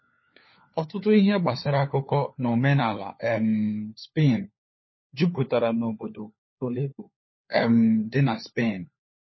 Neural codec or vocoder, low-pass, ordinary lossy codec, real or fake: codec, 16 kHz, 4 kbps, FunCodec, trained on LibriTTS, 50 frames a second; 7.2 kHz; MP3, 24 kbps; fake